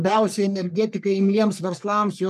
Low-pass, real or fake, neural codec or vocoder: 14.4 kHz; fake; codec, 44.1 kHz, 3.4 kbps, Pupu-Codec